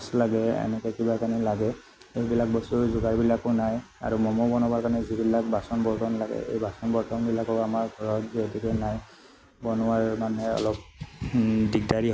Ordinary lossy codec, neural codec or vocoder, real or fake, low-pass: none; none; real; none